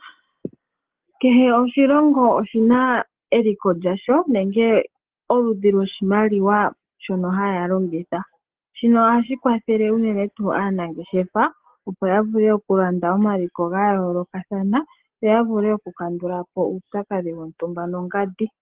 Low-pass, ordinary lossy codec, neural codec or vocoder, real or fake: 3.6 kHz; Opus, 16 kbps; none; real